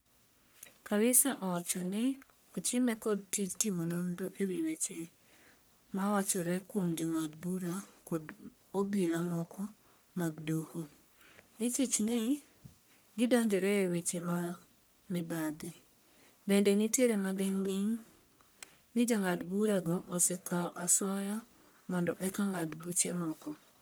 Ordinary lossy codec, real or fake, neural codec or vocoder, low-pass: none; fake; codec, 44.1 kHz, 1.7 kbps, Pupu-Codec; none